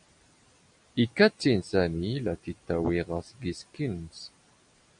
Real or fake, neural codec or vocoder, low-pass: real; none; 9.9 kHz